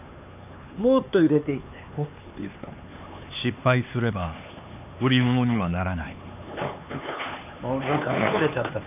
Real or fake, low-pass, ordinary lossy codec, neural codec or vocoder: fake; 3.6 kHz; AAC, 32 kbps; codec, 16 kHz, 4 kbps, X-Codec, HuBERT features, trained on LibriSpeech